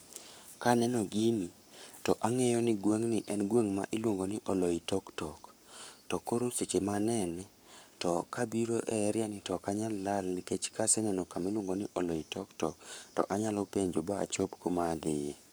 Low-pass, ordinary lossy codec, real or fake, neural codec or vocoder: none; none; fake; codec, 44.1 kHz, 7.8 kbps, Pupu-Codec